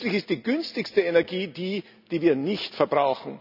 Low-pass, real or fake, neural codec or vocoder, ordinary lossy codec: 5.4 kHz; real; none; none